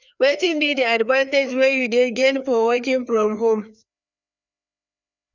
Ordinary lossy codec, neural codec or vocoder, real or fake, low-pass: none; codec, 16 kHz, 2 kbps, FreqCodec, larger model; fake; 7.2 kHz